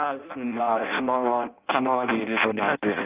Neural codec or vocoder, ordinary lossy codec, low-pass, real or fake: codec, 16 kHz in and 24 kHz out, 0.6 kbps, FireRedTTS-2 codec; Opus, 32 kbps; 3.6 kHz; fake